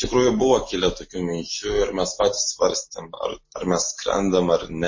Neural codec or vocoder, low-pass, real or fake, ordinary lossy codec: none; 7.2 kHz; real; MP3, 32 kbps